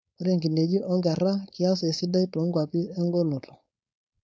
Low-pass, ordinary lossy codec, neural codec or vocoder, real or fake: none; none; codec, 16 kHz, 4.8 kbps, FACodec; fake